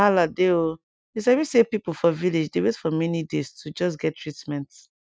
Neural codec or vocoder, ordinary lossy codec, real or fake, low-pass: none; none; real; none